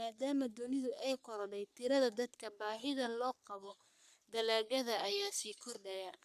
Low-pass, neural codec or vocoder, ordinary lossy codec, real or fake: 14.4 kHz; codec, 44.1 kHz, 3.4 kbps, Pupu-Codec; none; fake